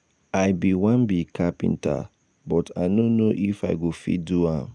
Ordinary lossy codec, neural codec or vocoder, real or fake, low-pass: none; none; real; 9.9 kHz